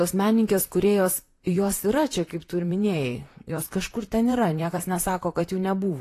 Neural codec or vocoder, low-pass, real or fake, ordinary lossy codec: vocoder, 44.1 kHz, 128 mel bands, Pupu-Vocoder; 14.4 kHz; fake; AAC, 48 kbps